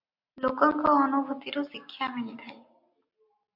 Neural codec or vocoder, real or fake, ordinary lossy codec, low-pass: none; real; MP3, 48 kbps; 5.4 kHz